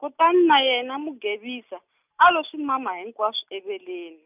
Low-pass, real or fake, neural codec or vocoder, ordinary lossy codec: 3.6 kHz; real; none; none